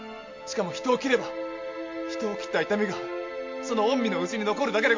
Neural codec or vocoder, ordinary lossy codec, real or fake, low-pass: none; MP3, 48 kbps; real; 7.2 kHz